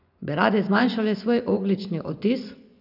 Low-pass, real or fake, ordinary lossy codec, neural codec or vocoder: 5.4 kHz; fake; none; vocoder, 24 kHz, 100 mel bands, Vocos